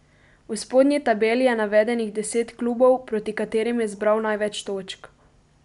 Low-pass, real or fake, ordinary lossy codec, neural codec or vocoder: 10.8 kHz; real; none; none